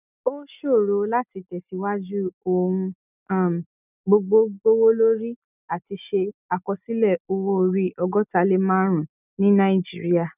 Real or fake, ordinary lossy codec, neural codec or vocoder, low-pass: real; none; none; 3.6 kHz